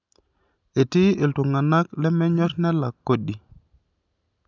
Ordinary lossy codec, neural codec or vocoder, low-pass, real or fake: none; none; 7.2 kHz; real